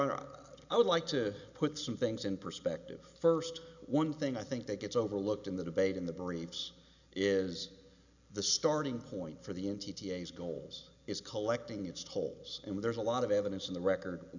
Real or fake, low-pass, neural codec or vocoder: real; 7.2 kHz; none